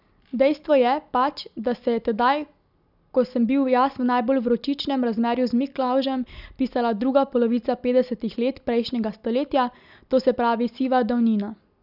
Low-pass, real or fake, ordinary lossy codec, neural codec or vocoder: 5.4 kHz; real; none; none